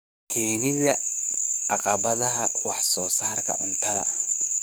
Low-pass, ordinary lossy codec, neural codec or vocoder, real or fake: none; none; codec, 44.1 kHz, 7.8 kbps, DAC; fake